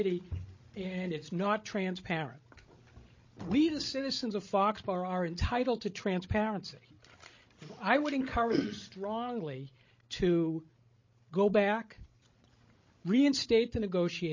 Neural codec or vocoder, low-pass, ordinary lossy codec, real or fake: codec, 16 kHz, 16 kbps, FreqCodec, larger model; 7.2 kHz; MP3, 32 kbps; fake